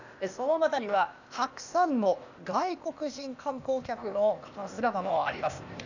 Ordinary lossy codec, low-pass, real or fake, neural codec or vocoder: none; 7.2 kHz; fake; codec, 16 kHz, 0.8 kbps, ZipCodec